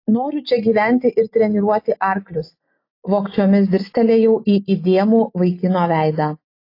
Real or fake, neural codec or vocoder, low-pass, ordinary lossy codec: real; none; 5.4 kHz; AAC, 32 kbps